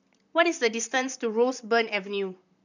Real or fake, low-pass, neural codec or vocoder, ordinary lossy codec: fake; 7.2 kHz; vocoder, 44.1 kHz, 128 mel bands, Pupu-Vocoder; none